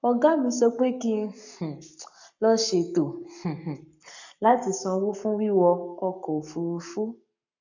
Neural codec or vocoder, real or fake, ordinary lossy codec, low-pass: codec, 44.1 kHz, 7.8 kbps, Pupu-Codec; fake; none; 7.2 kHz